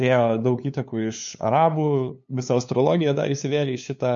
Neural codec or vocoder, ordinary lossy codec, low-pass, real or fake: codec, 16 kHz, 2 kbps, FunCodec, trained on LibriTTS, 25 frames a second; MP3, 48 kbps; 7.2 kHz; fake